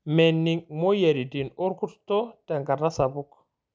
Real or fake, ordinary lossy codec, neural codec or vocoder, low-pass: real; none; none; none